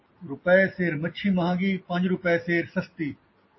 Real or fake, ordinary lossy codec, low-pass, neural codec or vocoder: real; MP3, 24 kbps; 7.2 kHz; none